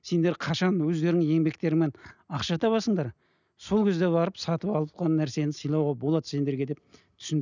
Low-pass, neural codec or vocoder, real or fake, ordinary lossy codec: 7.2 kHz; none; real; none